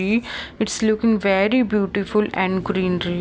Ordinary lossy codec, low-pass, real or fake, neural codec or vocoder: none; none; real; none